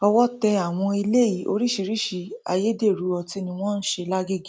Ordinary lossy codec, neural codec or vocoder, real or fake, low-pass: none; none; real; none